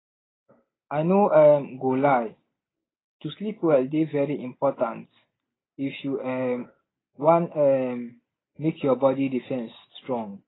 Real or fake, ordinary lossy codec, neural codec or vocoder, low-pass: real; AAC, 16 kbps; none; 7.2 kHz